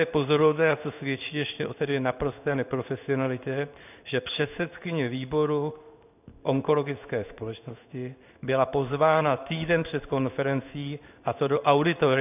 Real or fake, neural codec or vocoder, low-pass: fake; codec, 16 kHz in and 24 kHz out, 1 kbps, XY-Tokenizer; 3.6 kHz